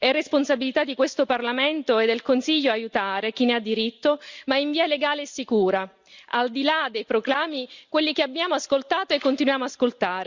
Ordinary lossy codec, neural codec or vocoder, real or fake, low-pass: Opus, 64 kbps; none; real; 7.2 kHz